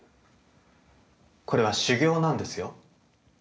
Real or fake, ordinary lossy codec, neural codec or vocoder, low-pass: real; none; none; none